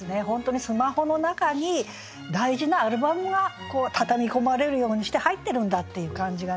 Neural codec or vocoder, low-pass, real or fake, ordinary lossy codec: none; none; real; none